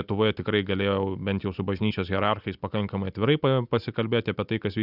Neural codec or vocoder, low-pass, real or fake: none; 5.4 kHz; real